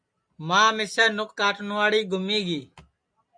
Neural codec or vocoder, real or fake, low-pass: none; real; 9.9 kHz